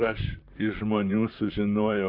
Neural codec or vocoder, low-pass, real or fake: vocoder, 44.1 kHz, 128 mel bands, Pupu-Vocoder; 5.4 kHz; fake